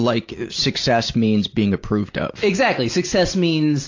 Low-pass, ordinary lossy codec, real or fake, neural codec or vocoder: 7.2 kHz; AAC, 48 kbps; real; none